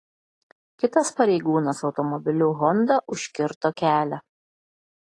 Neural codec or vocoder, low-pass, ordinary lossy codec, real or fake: none; 10.8 kHz; AAC, 32 kbps; real